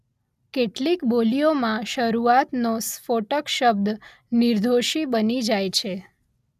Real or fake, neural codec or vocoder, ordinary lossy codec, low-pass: real; none; none; 14.4 kHz